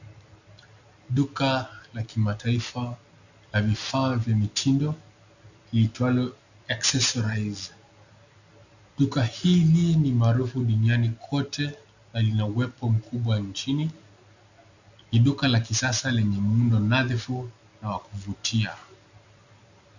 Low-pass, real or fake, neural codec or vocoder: 7.2 kHz; real; none